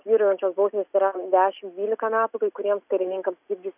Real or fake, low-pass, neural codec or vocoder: real; 3.6 kHz; none